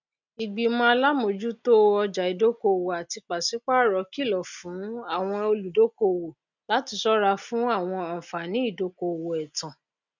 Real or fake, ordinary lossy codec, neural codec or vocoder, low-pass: real; none; none; 7.2 kHz